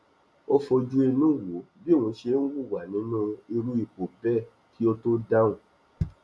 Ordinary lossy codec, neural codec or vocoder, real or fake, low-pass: none; none; real; none